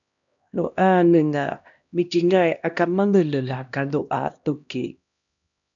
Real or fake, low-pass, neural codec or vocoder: fake; 7.2 kHz; codec, 16 kHz, 1 kbps, X-Codec, HuBERT features, trained on LibriSpeech